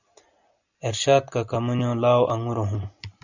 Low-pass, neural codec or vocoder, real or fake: 7.2 kHz; none; real